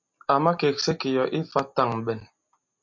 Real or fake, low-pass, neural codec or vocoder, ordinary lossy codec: real; 7.2 kHz; none; MP3, 48 kbps